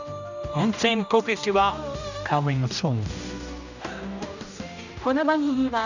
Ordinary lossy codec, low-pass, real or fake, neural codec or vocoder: none; 7.2 kHz; fake; codec, 16 kHz, 1 kbps, X-Codec, HuBERT features, trained on general audio